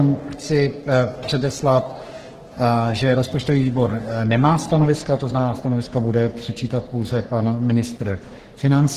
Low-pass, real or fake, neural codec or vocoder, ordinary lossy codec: 14.4 kHz; fake; codec, 44.1 kHz, 3.4 kbps, Pupu-Codec; Opus, 16 kbps